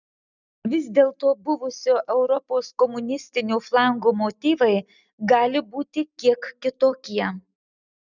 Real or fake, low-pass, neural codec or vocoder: real; 7.2 kHz; none